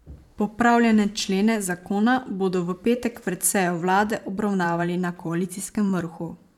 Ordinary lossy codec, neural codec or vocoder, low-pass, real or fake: none; vocoder, 44.1 kHz, 128 mel bands every 512 samples, BigVGAN v2; 19.8 kHz; fake